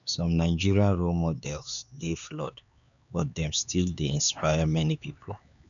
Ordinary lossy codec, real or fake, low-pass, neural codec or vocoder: none; fake; 7.2 kHz; codec, 16 kHz, 4 kbps, X-Codec, HuBERT features, trained on LibriSpeech